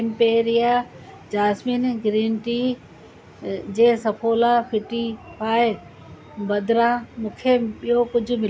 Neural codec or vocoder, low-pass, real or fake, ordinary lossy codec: none; none; real; none